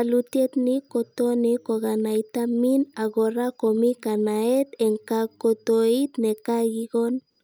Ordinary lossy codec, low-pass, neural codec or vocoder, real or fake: none; none; none; real